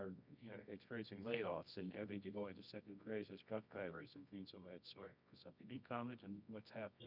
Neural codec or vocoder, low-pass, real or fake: codec, 24 kHz, 0.9 kbps, WavTokenizer, medium music audio release; 5.4 kHz; fake